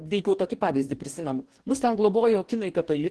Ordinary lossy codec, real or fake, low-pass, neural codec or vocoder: Opus, 16 kbps; fake; 10.8 kHz; codec, 44.1 kHz, 2.6 kbps, DAC